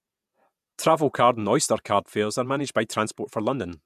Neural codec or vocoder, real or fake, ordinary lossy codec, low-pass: vocoder, 48 kHz, 128 mel bands, Vocos; fake; MP3, 96 kbps; 14.4 kHz